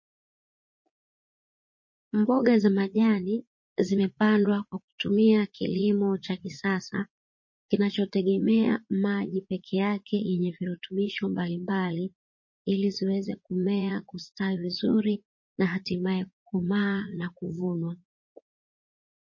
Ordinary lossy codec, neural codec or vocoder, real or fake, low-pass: MP3, 32 kbps; vocoder, 44.1 kHz, 80 mel bands, Vocos; fake; 7.2 kHz